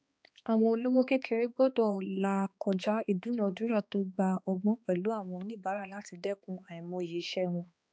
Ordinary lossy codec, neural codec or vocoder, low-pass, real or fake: none; codec, 16 kHz, 2 kbps, X-Codec, HuBERT features, trained on balanced general audio; none; fake